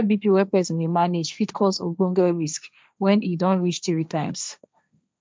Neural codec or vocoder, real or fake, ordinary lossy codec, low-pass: codec, 16 kHz, 1.1 kbps, Voila-Tokenizer; fake; none; none